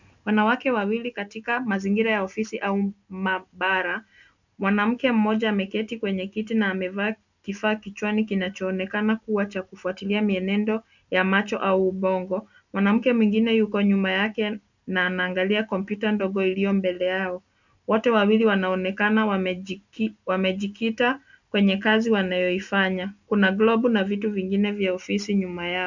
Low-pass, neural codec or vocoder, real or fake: 7.2 kHz; none; real